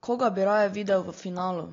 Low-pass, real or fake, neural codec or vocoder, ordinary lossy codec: 7.2 kHz; real; none; AAC, 32 kbps